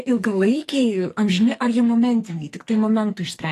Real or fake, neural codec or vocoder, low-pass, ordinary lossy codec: fake; codec, 44.1 kHz, 2.6 kbps, SNAC; 14.4 kHz; AAC, 48 kbps